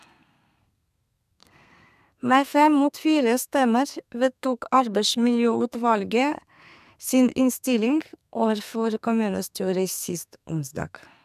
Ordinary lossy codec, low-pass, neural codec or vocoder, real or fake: none; 14.4 kHz; codec, 32 kHz, 1.9 kbps, SNAC; fake